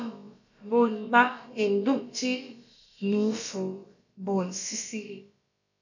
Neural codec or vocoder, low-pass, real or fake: codec, 16 kHz, about 1 kbps, DyCAST, with the encoder's durations; 7.2 kHz; fake